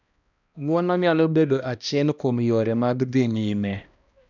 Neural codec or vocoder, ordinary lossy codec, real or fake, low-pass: codec, 16 kHz, 1 kbps, X-Codec, HuBERT features, trained on balanced general audio; none; fake; 7.2 kHz